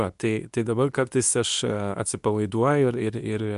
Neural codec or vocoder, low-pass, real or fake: codec, 24 kHz, 0.9 kbps, WavTokenizer, medium speech release version 2; 10.8 kHz; fake